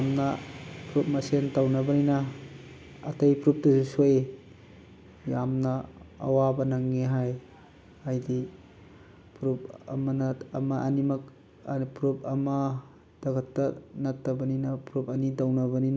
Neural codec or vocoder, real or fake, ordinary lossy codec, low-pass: none; real; none; none